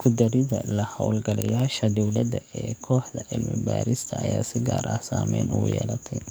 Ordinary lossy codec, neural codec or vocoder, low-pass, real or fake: none; codec, 44.1 kHz, 7.8 kbps, DAC; none; fake